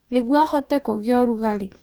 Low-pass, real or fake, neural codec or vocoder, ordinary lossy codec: none; fake; codec, 44.1 kHz, 2.6 kbps, DAC; none